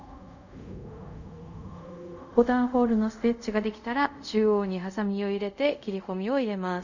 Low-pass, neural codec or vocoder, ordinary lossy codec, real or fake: 7.2 kHz; codec, 24 kHz, 0.5 kbps, DualCodec; none; fake